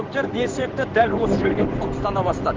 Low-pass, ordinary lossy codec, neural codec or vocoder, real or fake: 7.2 kHz; Opus, 16 kbps; codec, 16 kHz in and 24 kHz out, 1 kbps, XY-Tokenizer; fake